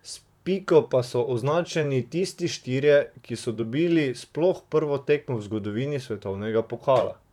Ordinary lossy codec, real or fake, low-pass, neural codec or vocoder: none; fake; 19.8 kHz; vocoder, 44.1 kHz, 128 mel bands, Pupu-Vocoder